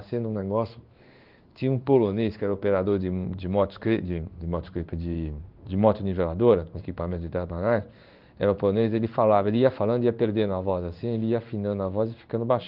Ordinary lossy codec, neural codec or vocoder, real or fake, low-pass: Opus, 24 kbps; codec, 16 kHz in and 24 kHz out, 1 kbps, XY-Tokenizer; fake; 5.4 kHz